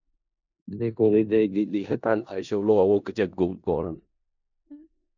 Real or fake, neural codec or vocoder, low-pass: fake; codec, 16 kHz in and 24 kHz out, 0.4 kbps, LongCat-Audio-Codec, four codebook decoder; 7.2 kHz